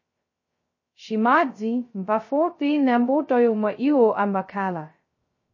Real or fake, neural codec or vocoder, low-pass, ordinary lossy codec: fake; codec, 16 kHz, 0.2 kbps, FocalCodec; 7.2 kHz; MP3, 32 kbps